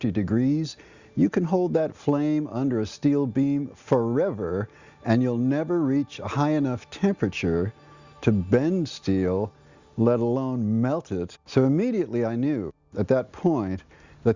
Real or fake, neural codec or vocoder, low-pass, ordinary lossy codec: real; none; 7.2 kHz; Opus, 64 kbps